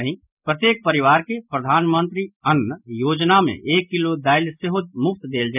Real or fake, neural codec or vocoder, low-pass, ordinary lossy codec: real; none; 3.6 kHz; none